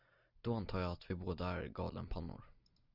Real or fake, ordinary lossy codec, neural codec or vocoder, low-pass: real; Opus, 64 kbps; none; 5.4 kHz